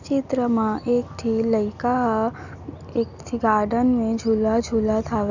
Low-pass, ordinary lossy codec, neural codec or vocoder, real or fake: 7.2 kHz; none; none; real